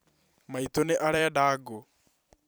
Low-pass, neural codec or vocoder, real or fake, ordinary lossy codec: none; vocoder, 44.1 kHz, 128 mel bands every 256 samples, BigVGAN v2; fake; none